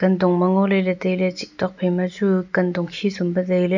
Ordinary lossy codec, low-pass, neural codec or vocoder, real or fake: none; 7.2 kHz; none; real